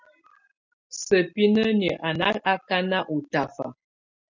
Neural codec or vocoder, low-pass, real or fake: none; 7.2 kHz; real